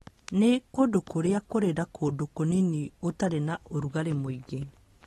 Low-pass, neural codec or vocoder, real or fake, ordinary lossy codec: 19.8 kHz; none; real; AAC, 32 kbps